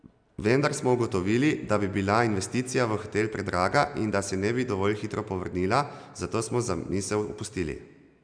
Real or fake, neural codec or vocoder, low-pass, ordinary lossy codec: real; none; 9.9 kHz; none